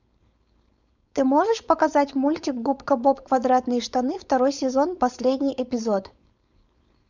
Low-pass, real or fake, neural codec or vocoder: 7.2 kHz; fake; codec, 16 kHz, 4.8 kbps, FACodec